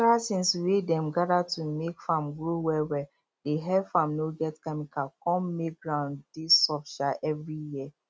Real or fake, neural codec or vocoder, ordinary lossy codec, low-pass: real; none; none; none